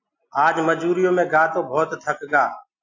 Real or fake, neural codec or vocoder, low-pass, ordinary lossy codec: real; none; 7.2 kHz; AAC, 48 kbps